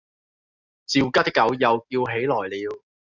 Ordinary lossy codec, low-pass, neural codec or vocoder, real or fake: Opus, 64 kbps; 7.2 kHz; none; real